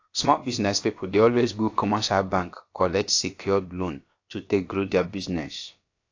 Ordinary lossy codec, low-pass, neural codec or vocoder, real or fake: AAC, 48 kbps; 7.2 kHz; codec, 16 kHz, about 1 kbps, DyCAST, with the encoder's durations; fake